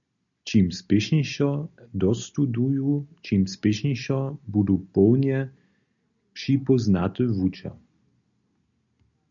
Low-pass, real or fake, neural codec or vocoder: 7.2 kHz; real; none